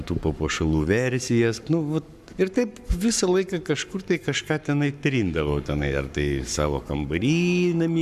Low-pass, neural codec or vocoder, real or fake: 14.4 kHz; codec, 44.1 kHz, 7.8 kbps, Pupu-Codec; fake